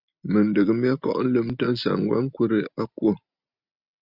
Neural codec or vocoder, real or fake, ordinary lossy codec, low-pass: none; real; Opus, 64 kbps; 5.4 kHz